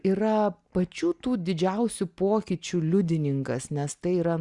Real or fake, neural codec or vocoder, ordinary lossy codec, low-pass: real; none; Opus, 64 kbps; 10.8 kHz